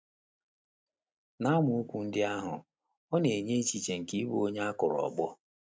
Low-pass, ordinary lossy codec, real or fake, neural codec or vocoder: none; none; real; none